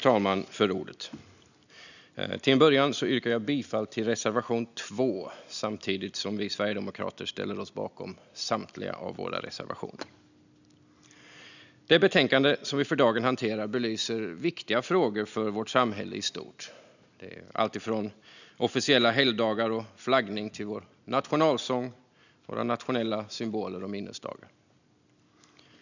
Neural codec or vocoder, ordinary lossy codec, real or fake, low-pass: none; none; real; 7.2 kHz